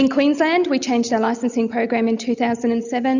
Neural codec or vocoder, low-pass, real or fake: none; 7.2 kHz; real